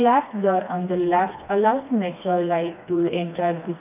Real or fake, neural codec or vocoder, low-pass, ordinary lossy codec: fake; codec, 16 kHz, 2 kbps, FreqCodec, smaller model; 3.6 kHz; none